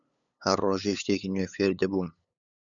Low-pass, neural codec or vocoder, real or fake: 7.2 kHz; codec, 16 kHz, 8 kbps, FunCodec, trained on LibriTTS, 25 frames a second; fake